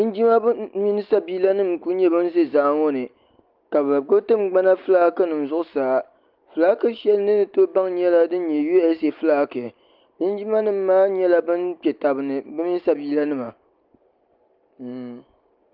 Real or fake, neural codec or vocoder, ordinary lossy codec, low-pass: real; none; Opus, 24 kbps; 5.4 kHz